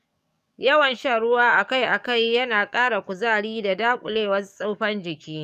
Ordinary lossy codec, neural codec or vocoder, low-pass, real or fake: none; codec, 44.1 kHz, 7.8 kbps, DAC; 14.4 kHz; fake